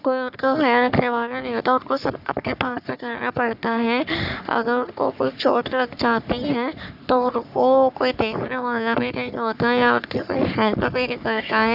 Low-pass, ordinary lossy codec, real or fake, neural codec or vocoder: 5.4 kHz; none; fake; codec, 44.1 kHz, 3.4 kbps, Pupu-Codec